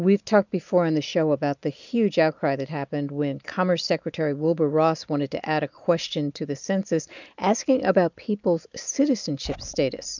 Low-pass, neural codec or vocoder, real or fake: 7.2 kHz; none; real